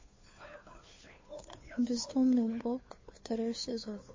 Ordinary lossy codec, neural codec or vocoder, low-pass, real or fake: MP3, 32 kbps; codec, 16 kHz, 2 kbps, FunCodec, trained on Chinese and English, 25 frames a second; 7.2 kHz; fake